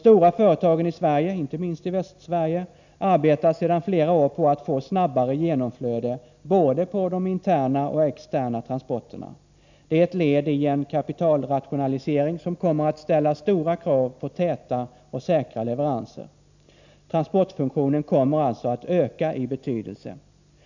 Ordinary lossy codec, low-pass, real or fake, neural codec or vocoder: none; 7.2 kHz; real; none